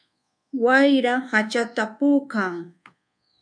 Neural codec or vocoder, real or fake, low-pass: codec, 24 kHz, 1.2 kbps, DualCodec; fake; 9.9 kHz